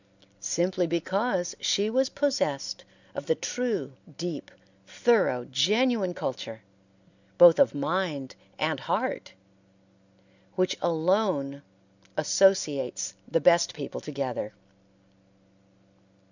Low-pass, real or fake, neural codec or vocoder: 7.2 kHz; real; none